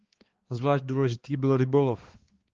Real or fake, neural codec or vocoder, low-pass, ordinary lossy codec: fake; codec, 16 kHz, 2 kbps, X-Codec, HuBERT features, trained on balanced general audio; 7.2 kHz; Opus, 16 kbps